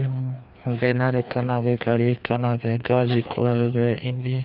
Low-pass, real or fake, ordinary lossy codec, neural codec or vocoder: 5.4 kHz; fake; none; codec, 16 kHz, 2 kbps, FreqCodec, larger model